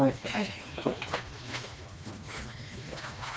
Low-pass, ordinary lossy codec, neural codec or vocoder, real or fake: none; none; codec, 16 kHz, 2 kbps, FreqCodec, smaller model; fake